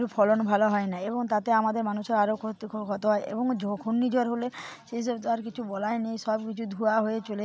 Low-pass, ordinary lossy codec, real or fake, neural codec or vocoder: none; none; real; none